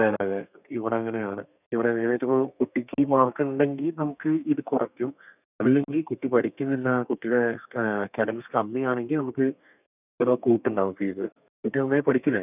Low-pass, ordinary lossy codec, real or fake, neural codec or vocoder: 3.6 kHz; none; fake; codec, 32 kHz, 1.9 kbps, SNAC